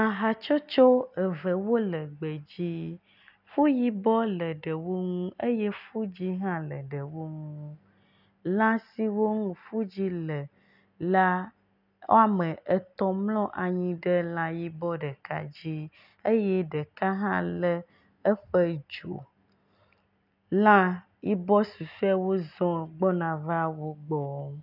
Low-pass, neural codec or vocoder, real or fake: 5.4 kHz; none; real